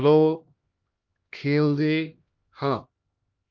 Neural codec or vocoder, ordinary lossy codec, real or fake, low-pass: codec, 16 kHz, 1 kbps, X-Codec, HuBERT features, trained on LibriSpeech; Opus, 24 kbps; fake; 7.2 kHz